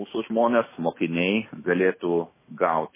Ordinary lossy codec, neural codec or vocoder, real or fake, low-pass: MP3, 16 kbps; autoencoder, 48 kHz, 128 numbers a frame, DAC-VAE, trained on Japanese speech; fake; 3.6 kHz